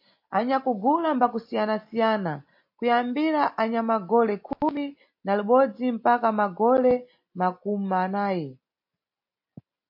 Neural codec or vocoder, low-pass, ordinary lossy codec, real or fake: none; 5.4 kHz; MP3, 32 kbps; real